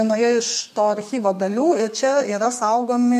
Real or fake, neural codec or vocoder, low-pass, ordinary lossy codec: fake; codec, 32 kHz, 1.9 kbps, SNAC; 14.4 kHz; MP3, 64 kbps